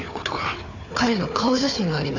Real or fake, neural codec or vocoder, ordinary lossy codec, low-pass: fake; codec, 16 kHz, 4 kbps, FunCodec, trained on Chinese and English, 50 frames a second; AAC, 48 kbps; 7.2 kHz